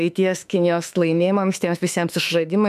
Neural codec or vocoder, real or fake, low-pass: autoencoder, 48 kHz, 32 numbers a frame, DAC-VAE, trained on Japanese speech; fake; 14.4 kHz